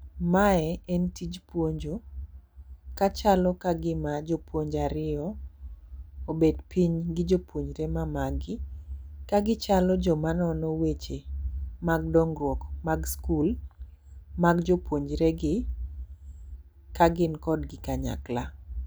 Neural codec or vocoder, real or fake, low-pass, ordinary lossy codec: none; real; none; none